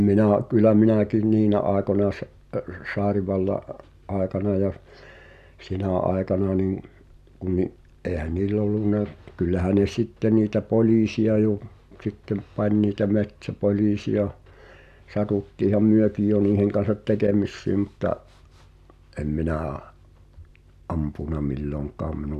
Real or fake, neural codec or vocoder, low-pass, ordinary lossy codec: real; none; 14.4 kHz; none